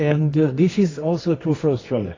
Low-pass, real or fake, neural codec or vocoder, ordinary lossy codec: 7.2 kHz; fake; codec, 24 kHz, 0.9 kbps, WavTokenizer, medium music audio release; AAC, 32 kbps